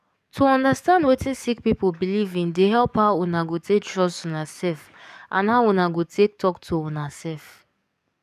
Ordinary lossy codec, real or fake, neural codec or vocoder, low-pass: none; fake; codec, 44.1 kHz, 7.8 kbps, DAC; 14.4 kHz